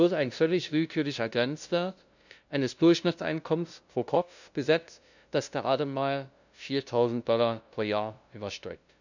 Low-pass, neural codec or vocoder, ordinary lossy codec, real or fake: 7.2 kHz; codec, 16 kHz, 0.5 kbps, FunCodec, trained on LibriTTS, 25 frames a second; none; fake